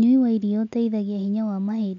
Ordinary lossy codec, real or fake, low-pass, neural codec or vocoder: none; real; 7.2 kHz; none